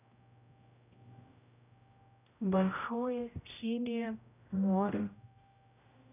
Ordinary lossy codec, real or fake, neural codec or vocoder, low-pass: none; fake; codec, 16 kHz, 0.5 kbps, X-Codec, HuBERT features, trained on general audio; 3.6 kHz